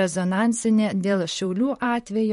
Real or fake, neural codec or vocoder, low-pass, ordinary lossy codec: fake; vocoder, 44.1 kHz, 128 mel bands, Pupu-Vocoder; 19.8 kHz; MP3, 48 kbps